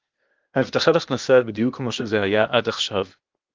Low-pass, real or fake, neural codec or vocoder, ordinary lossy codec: 7.2 kHz; fake; codec, 16 kHz, 0.8 kbps, ZipCodec; Opus, 24 kbps